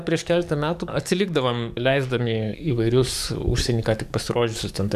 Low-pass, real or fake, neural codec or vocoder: 14.4 kHz; fake; codec, 44.1 kHz, 7.8 kbps, DAC